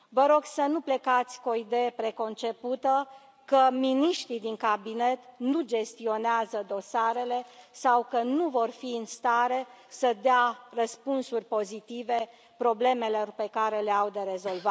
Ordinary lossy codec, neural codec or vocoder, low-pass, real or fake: none; none; none; real